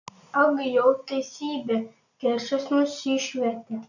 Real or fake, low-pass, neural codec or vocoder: real; 7.2 kHz; none